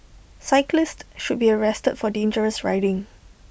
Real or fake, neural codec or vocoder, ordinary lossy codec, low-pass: real; none; none; none